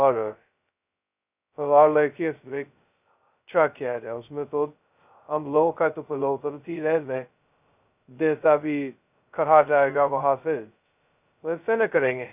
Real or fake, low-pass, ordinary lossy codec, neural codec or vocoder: fake; 3.6 kHz; none; codec, 16 kHz, 0.2 kbps, FocalCodec